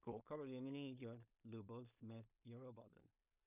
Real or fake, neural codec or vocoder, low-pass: fake; codec, 16 kHz in and 24 kHz out, 0.4 kbps, LongCat-Audio-Codec, two codebook decoder; 3.6 kHz